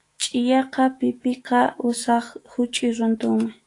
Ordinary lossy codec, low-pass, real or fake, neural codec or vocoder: AAC, 48 kbps; 10.8 kHz; fake; autoencoder, 48 kHz, 128 numbers a frame, DAC-VAE, trained on Japanese speech